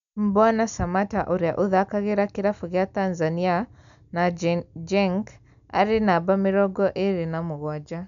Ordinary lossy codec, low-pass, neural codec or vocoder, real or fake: none; 7.2 kHz; none; real